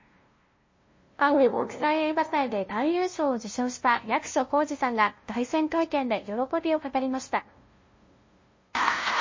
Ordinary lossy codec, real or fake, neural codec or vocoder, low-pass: MP3, 32 kbps; fake; codec, 16 kHz, 0.5 kbps, FunCodec, trained on LibriTTS, 25 frames a second; 7.2 kHz